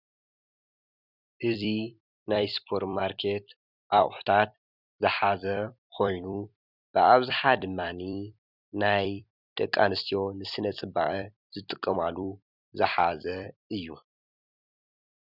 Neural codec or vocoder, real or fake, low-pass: vocoder, 44.1 kHz, 128 mel bands every 256 samples, BigVGAN v2; fake; 5.4 kHz